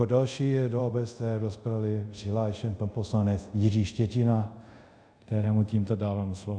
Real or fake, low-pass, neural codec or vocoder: fake; 9.9 kHz; codec, 24 kHz, 0.5 kbps, DualCodec